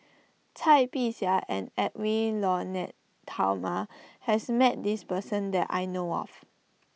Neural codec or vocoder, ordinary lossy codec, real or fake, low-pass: none; none; real; none